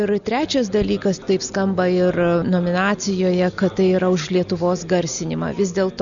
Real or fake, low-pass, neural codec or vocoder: real; 7.2 kHz; none